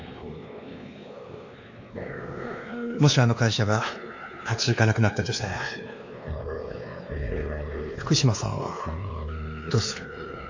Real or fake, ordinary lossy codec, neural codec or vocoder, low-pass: fake; AAC, 48 kbps; codec, 16 kHz, 2 kbps, X-Codec, WavLM features, trained on Multilingual LibriSpeech; 7.2 kHz